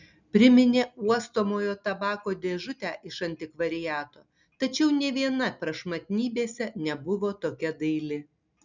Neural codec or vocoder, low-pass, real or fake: none; 7.2 kHz; real